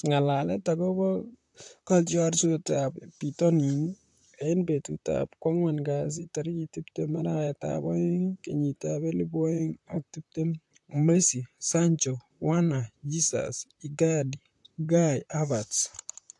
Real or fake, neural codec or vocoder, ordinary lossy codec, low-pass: fake; vocoder, 44.1 kHz, 128 mel bands, Pupu-Vocoder; AAC, 64 kbps; 10.8 kHz